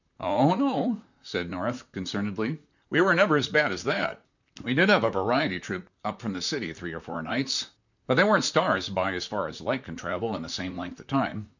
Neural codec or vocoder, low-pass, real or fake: vocoder, 44.1 kHz, 128 mel bands, Pupu-Vocoder; 7.2 kHz; fake